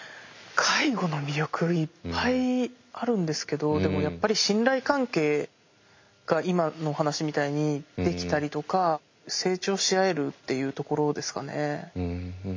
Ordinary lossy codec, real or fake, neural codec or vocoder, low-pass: MP3, 48 kbps; real; none; 7.2 kHz